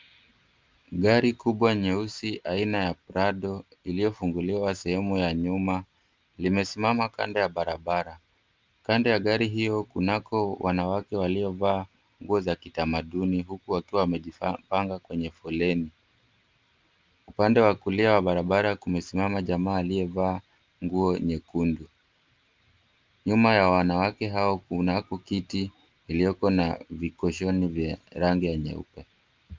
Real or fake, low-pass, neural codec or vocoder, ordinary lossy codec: real; 7.2 kHz; none; Opus, 32 kbps